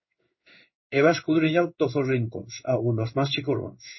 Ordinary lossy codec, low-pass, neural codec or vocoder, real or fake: MP3, 24 kbps; 7.2 kHz; codec, 16 kHz in and 24 kHz out, 1 kbps, XY-Tokenizer; fake